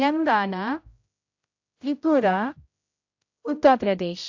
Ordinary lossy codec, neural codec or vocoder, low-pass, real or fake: AAC, 48 kbps; codec, 16 kHz, 0.5 kbps, X-Codec, HuBERT features, trained on general audio; 7.2 kHz; fake